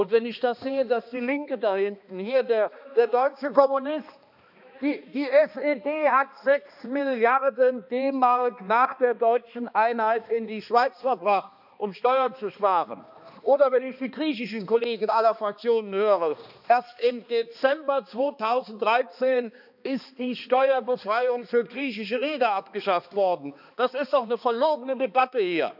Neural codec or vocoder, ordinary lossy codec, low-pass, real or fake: codec, 16 kHz, 2 kbps, X-Codec, HuBERT features, trained on balanced general audio; none; 5.4 kHz; fake